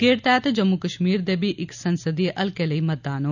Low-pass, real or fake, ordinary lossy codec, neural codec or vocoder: 7.2 kHz; real; none; none